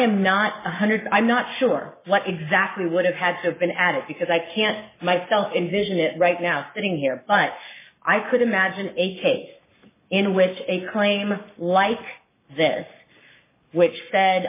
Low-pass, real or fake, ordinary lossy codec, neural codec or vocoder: 3.6 kHz; fake; MP3, 16 kbps; codec, 44.1 kHz, 7.8 kbps, Pupu-Codec